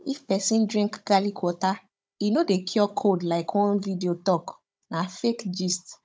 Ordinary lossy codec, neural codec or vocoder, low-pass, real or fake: none; codec, 16 kHz, 16 kbps, FunCodec, trained on Chinese and English, 50 frames a second; none; fake